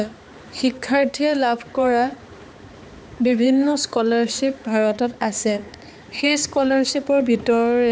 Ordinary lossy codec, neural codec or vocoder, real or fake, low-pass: none; codec, 16 kHz, 4 kbps, X-Codec, HuBERT features, trained on balanced general audio; fake; none